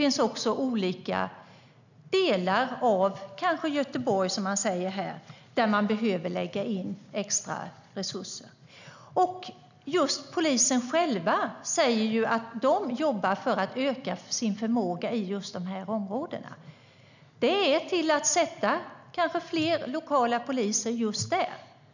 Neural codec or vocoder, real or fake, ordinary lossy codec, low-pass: none; real; none; 7.2 kHz